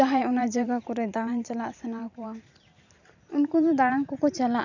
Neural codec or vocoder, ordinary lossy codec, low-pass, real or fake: vocoder, 44.1 kHz, 128 mel bands every 512 samples, BigVGAN v2; none; 7.2 kHz; fake